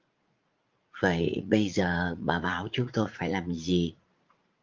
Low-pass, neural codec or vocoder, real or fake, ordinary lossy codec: 7.2 kHz; vocoder, 44.1 kHz, 80 mel bands, Vocos; fake; Opus, 24 kbps